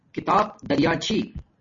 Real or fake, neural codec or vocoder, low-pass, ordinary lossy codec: real; none; 7.2 kHz; MP3, 32 kbps